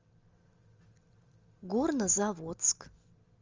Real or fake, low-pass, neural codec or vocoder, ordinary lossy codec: real; 7.2 kHz; none; Opus, 32 kbps